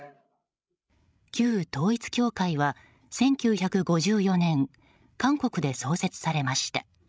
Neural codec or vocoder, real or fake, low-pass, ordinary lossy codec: codec, 16 kHz, 16 kbps, FreqCodec, larger model; fake; none; none